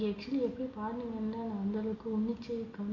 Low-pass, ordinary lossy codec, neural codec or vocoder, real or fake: 7.2 kHz; none; none; real